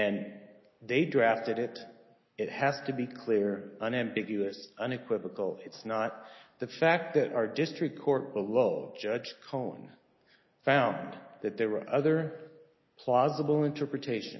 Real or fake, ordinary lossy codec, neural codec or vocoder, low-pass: real; MP3, 24 kbps; none; 7.2 kHz